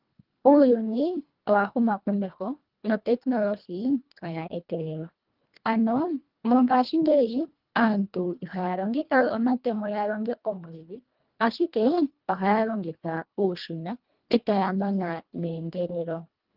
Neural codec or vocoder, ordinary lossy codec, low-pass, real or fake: codec, 24 kHz, 1.5 kbps, HILCodec; Opus, 32 kbps; 5.4 kHz; fake